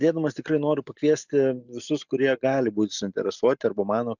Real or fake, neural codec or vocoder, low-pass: real; none; 7.2 kHz